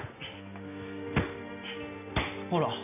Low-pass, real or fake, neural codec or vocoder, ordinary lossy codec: 3.6 kHz; real; none; none